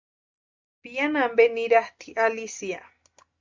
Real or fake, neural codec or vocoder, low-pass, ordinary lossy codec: real; none; 7.2 kHz; MP3, 64 kbps